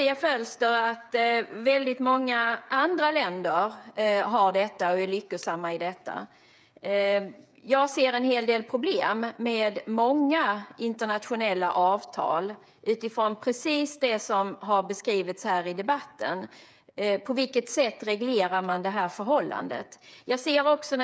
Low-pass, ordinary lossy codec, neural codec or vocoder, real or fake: none; none; codec, 16 kHz, 8 kbps, FreqCodec, smaller model; fake